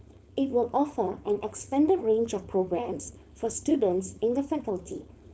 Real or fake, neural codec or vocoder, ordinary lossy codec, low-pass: fake; codec, 16 kHz, 4.8 kbps, FACodec; none; none